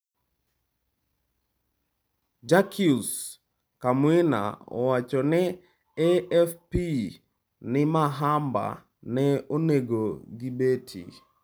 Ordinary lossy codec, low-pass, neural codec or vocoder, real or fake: none; none; none; real